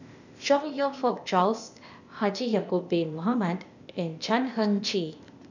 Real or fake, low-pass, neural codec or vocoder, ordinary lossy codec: fake; 7.2 kHz; codec, 16 kHz, 0.8 kbps, ZipCodec; none